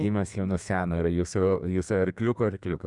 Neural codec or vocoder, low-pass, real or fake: codec, 32 kHz, 1.9 kbps, SNAC; 10.8 kHz; fake